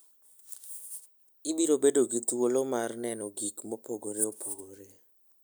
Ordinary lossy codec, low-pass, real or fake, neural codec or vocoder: none; none; real; none